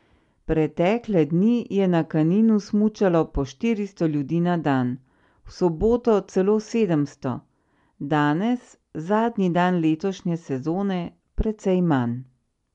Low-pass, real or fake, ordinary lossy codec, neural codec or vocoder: 10.8 kHz; real; MP3, 64 kbps; none